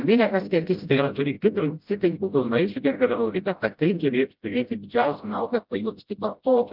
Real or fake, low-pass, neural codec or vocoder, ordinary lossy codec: fake; 5.4 kHz; codec, 16 kHz, 0.5 kbps, FreqCodec, smaller model; Opus, 24 kbps